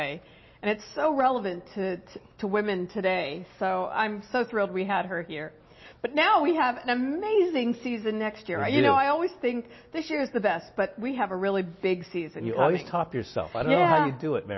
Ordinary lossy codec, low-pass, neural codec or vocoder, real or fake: MP3, 24 kbps; 7.2 kHz; none; real